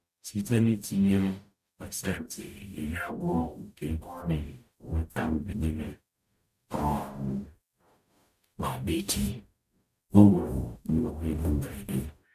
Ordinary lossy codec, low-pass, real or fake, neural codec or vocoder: none; 14.4 kHz; fake; codec, 44.1 kHz, 0.9 kbps, DAC